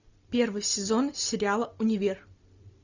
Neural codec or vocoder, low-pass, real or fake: none; 7.2 kHz; real